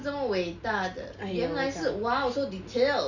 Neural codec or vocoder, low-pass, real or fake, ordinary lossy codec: none; 7.2 kHz; real; none